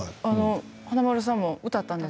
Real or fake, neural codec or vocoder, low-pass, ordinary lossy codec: real; none; none; none